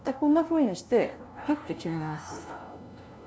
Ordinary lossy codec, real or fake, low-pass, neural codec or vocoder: none; fake; none; codec, 16 kHz, 0.5 kbps, FunCodec, trained on LibriTTS, 25 frames a second